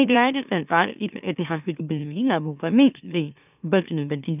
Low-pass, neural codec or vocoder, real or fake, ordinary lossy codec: 3.6 kHz; autoencoder, 44.1 kHz, a latent of 192 numbers a frame, MeloTTS; fake; none